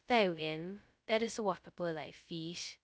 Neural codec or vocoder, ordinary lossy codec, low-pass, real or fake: codec, 16 kHz, 0.2 kbps, FocalCodec; none; none; fake